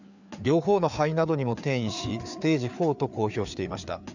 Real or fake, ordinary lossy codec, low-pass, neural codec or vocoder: fake; none; 7.2 kHz; codec, 16 kHz, 4 kbps, FreqCodec, larger model